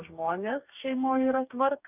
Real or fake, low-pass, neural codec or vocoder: fake; 3.6 kHz; codec, 44.1 kHz, 2.6 kbps, DAC